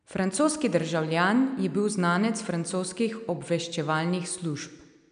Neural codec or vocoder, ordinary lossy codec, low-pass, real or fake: none; none; 9.9 kHz; real